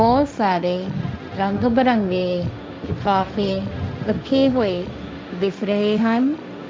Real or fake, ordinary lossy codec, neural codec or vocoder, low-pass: fake; none; codec, 16 kHz, 1.1 kbps, Voila-Tokenizer; none